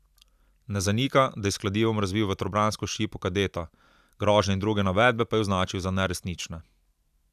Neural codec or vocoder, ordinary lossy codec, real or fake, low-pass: none; none; real; 14.4 kHz